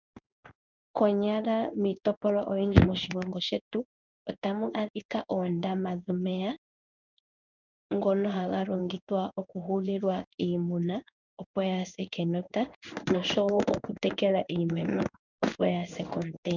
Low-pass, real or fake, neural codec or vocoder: 7.2 kHz; fake; codec, 16 kHz in and 24 kHz out, 1 kbps, XY-Tokenizer